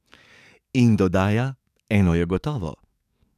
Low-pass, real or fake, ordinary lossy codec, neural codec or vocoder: 14.4 kHz; fake; none; codec, 44.1 kHz, 7.8 kbps, DAC